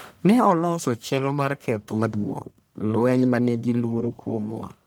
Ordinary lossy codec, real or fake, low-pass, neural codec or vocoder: none; fake; none; codec, 44.1 kHz, 1.7 kbps, Pupu-Codec